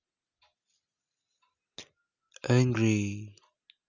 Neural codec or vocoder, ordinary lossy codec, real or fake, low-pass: none; none; real; 7.2 kHz